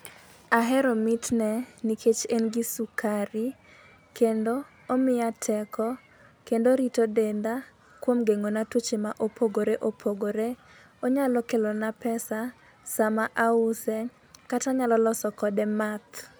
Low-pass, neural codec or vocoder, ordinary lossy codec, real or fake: none; none; none; real